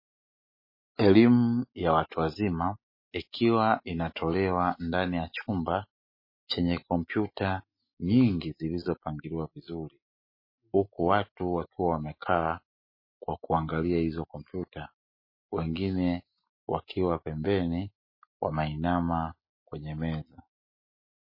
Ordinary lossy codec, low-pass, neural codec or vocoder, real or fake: MP3, 24 kbps; 5.4 kHz; none; real